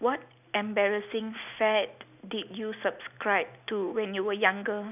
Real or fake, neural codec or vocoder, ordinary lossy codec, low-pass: real; none; none; 3.6 kHz